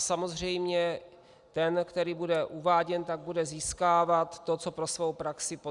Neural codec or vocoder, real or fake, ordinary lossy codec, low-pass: none; real; Opus, 64 kbps; 10.8 kHz